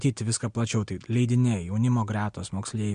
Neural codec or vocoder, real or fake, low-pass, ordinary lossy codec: none; real; 9.9 kHz; MP3, 64 kbps